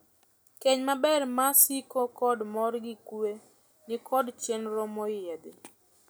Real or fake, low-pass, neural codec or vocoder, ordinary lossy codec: real; none; none; none